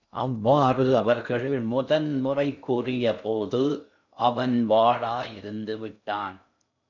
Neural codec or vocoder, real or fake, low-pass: codec, 16 kHz in and 24 kHz out, 0.6 kbps, FocalCodec, streaming, 2048 codes; fake; 7.2 kHz